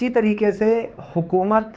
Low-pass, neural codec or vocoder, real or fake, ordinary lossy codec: none; codec, 16 kHz, 4 kbps, X-Codec, HuBERT features, trained on LibriSpeech; fake; none